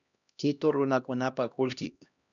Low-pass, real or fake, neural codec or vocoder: 7.2 kHz; fake; codec, 16 kHz, 1 kbps, X-Codec, HuBERT features, trained on LibriSpeech